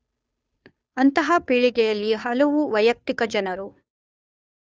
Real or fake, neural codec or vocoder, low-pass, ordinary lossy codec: fake; codec, 16 kHz, 2 kbps, FunCodec, trained on Chinese and English, 25 frames a second; none; none